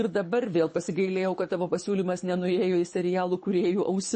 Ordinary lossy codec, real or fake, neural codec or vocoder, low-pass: MP3, 32 kbps; fake; codec, 24 kHz, 6 kbps, HILCodec; 9.9 kHz